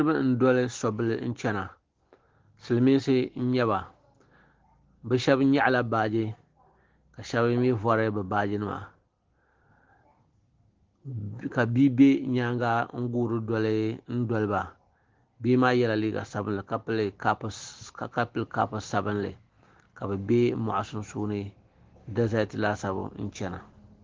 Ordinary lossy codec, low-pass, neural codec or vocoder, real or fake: Opus, 16 kbps; 7.2 kHz; none; real